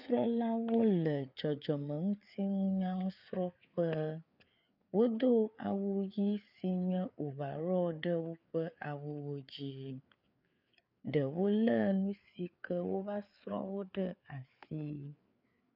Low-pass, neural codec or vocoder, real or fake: 5.4 kHz; codec, 16 kHz, 4 kbps, FreqCodec, larger model; fake